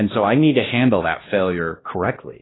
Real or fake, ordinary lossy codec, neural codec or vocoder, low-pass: fake; AAC, 16 kbps; codec, 16 kHz, 1 kbps, X-Codec, WavLM features, trained on Multilingual LibriSpeech; 7.2 kHz